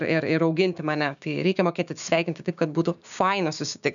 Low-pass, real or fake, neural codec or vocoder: 7.2 kHz; fake; codec, 16 kHz, 6 kbps, DAC